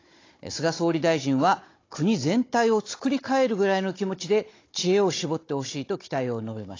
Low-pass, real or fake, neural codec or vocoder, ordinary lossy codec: 7.2 kHz; fake; codec, 16 kHz, 16 kbps, FunCodec, trained on Chinese and English, 50 frames a second; AAC, 32 kbps